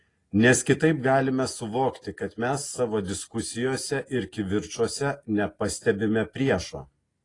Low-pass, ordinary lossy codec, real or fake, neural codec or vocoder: 10.8 kHz; AAC, 32 kbps; real; none